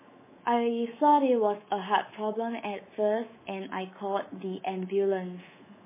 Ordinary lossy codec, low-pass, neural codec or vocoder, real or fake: MP3, 16 kbps; 3.6 kHz; codec, 24 kHz, 3.1 kbps, DualCodec; fake